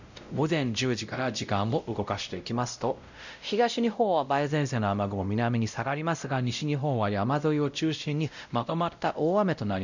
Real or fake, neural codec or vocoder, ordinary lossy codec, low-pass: fake; codec, 16 kHz, 0.5 kbps, X-Codec, WavLM features, trained on Multilingual LibriSpeech; none; 7.2 kHz